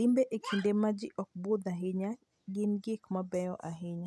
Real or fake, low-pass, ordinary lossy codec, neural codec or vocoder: real; none; none; none